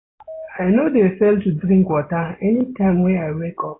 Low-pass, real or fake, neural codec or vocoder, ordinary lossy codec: 7.2 kHz; real; none; AAC, 16 kbps